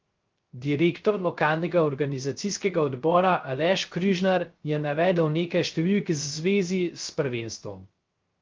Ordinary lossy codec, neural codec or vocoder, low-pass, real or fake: Opus, 32 kbps; codec, 16 kHz, 0.3 kbps, FocalCodec; 7.2 kHz; fake